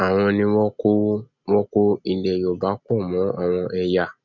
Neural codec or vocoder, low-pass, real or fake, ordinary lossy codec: none; 7.2 kHz; real; none